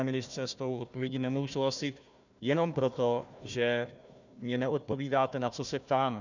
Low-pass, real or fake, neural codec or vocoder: 7.2 kHz; fake; codec, 16 kHz, 1 kbps, FunCodec, trained on Chinese and English, 50 frames a second